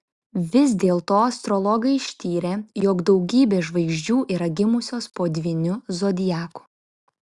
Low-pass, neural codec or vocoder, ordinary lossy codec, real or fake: 10.8 kHz; none; Opus, 64 kbps; real